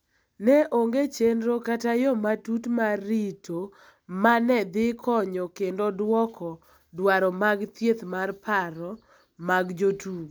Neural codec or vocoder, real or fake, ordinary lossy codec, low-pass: none; real; none; none